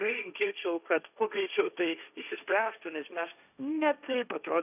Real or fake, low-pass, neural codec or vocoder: fake; 3.6 kHz; codec, 16 kHz, 1.1 kbps, Voila-Tokenizer